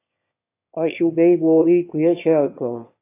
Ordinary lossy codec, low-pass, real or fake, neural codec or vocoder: AAC, 24 kbps; 3.6 kHz; fake; autoencoder, 22.05 kHz, a latent of 192 numbers a frame, VITS, trained on one speaker